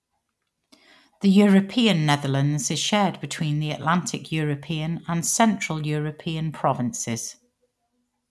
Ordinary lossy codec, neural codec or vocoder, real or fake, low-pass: none; none; real; none